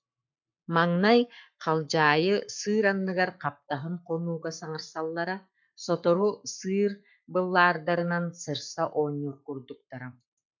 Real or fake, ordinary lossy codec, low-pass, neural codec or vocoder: fake; MP3, 64 kbps; 7.2 kHz; codec, 44.1 kHz, 7.8 kbps, Pupu-Codec